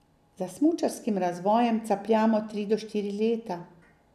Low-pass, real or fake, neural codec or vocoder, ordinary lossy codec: 14.4 kHz; real; none; none